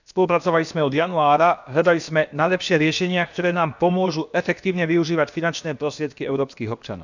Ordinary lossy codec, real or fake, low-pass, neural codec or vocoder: none; fake; 7.2 kHz; codec, 16 kHz, about 1 kbps, DyCAST, with the encoder's durations